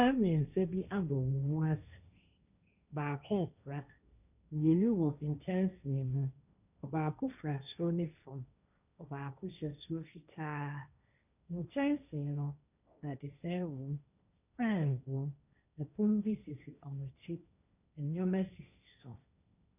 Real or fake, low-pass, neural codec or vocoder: fake; 3.6 kHz; codec, 16 kHz, 1.1 kbps, Voila-Tokenizer